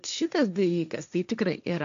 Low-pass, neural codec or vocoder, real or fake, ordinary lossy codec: 7.2 kHz; codec, 16 kHz, 1.1 kbps, Voila-Tokenizer; fake; AAC, 96 kbps